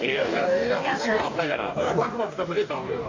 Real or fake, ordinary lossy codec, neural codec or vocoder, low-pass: fake; MP3, 64 kbps; codec, 44.1 kHz, 2.6 kbps, DAC; 7.2 kHz